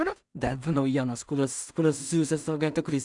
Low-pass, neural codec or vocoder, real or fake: 10.8 kHz; codec, 16 kHz in and 24 kHz out, 0.4 kbps, LongCat-Audio-Codec, two codebook decoder; fake